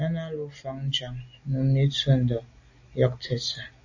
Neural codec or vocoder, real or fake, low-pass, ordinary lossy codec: none; real; 7.2 kHz; AAC, 48 kbps